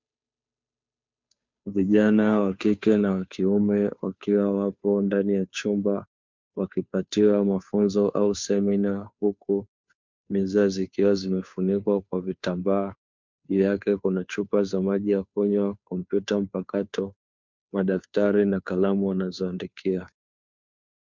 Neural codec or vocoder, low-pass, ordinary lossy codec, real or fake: codec, 16 kHz, 2 kbps, FunCodec, trained on Chinese and English, 25 frames a second; 7.2 kHz; MP3, 64 kbps; fake